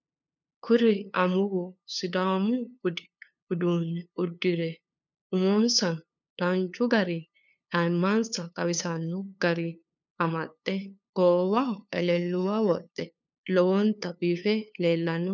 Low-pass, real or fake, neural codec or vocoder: 7.2 kHz; fake; codec, 16 kHz, 2 kbps, FunCodec, trained on LibriTTS, 25 frames a second